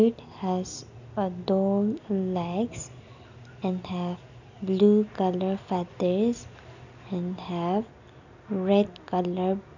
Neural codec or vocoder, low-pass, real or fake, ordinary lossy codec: none; 7.2 kHz; real; none